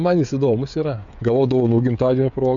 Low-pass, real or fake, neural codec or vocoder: 7.2 kHz; fake; codec, 16 kHz, 16 kbps, FreqCodec, smaller model